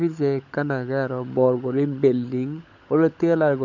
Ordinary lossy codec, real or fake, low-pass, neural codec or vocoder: none; fake; 7.2 kHz; codec, 16 kHz, 16 kbps, FunCodec, trained on LibriTTS, 50 frames a second